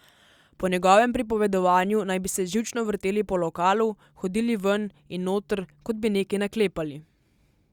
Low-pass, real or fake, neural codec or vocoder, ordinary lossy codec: 19.8 kHz; real; none; Opus, 64 kbps